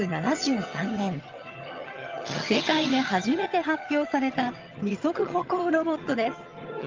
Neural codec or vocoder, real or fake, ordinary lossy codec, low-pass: vocoder, 22.05 kHz, 80 mel bands, HiFi-GAN; fake; Opus, 32 kbps; 7.2 kHz